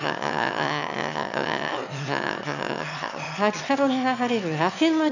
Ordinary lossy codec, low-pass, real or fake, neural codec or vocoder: none; 7.2 kHz; fake; autoencoder, 22.05 kHz, a latent of 192 numbers a frame, VITS, trained on one speaker